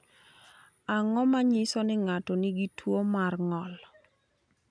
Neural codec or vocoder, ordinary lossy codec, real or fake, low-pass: none; none; real; 9.9 kHz